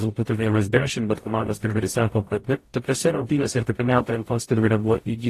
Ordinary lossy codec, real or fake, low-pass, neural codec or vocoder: AAC, 48 kbps; fake; 14.4 kHz; codec, 44.1 kHz, 0.9 kbps, DAC